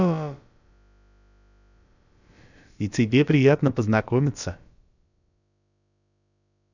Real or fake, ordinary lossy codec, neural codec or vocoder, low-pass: fake; none; codec, 16 kHz, about 1 kbps, DyCAST, with the encoder's durations; 7.2 kHz